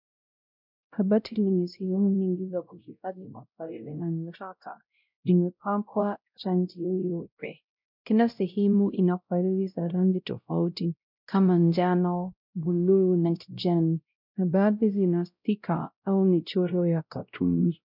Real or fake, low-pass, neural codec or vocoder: fake; 5.4 kHz; codec, 16 kHz, 0.5 kbps, X-Codec, WavLM features, trained on Multilingual LibriSpeech